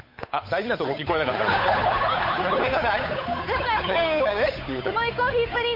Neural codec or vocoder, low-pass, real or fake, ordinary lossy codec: codec, 16 kHz, 8 kbps, FunCodec, trained on Chinese and English, 25 frames a second; 5.4 kHz; fake; MP3, 32 kbps